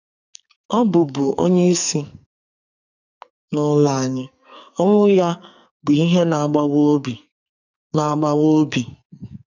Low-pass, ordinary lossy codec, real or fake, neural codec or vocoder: 7.2 kHz; none; fake; codec, 44.1 kHz, 2.6 kbps, SNAC